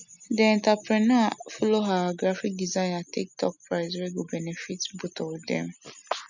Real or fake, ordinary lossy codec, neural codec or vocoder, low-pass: real; none; none; 7.2 kHz